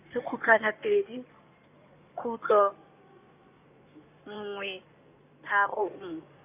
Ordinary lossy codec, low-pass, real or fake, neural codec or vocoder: none; 3.6 kHz; fake; codec, 16 kHz in and 24 kHz out, 2.2 kbps, FireRedTTS-2 codec